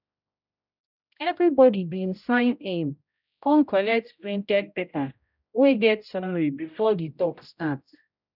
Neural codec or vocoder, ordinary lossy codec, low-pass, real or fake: codec, 16 kHz, 0.5 kbps, X-Codec, HuBERT features, trained on general audio; none; 5.4 kHz; fake